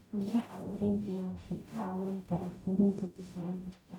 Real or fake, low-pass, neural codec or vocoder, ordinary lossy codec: fake; 19.8 kHz; codec, 44.1 kHz, 0.9 kbps, DAC; none